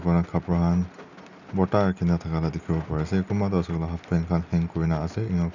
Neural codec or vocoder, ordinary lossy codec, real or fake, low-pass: none; none; real; 7.2 kHz